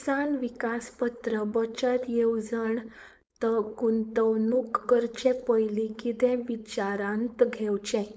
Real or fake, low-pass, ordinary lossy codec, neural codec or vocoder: fake; none; none; codec, 16 kHz, 4.8 kbps, FACodec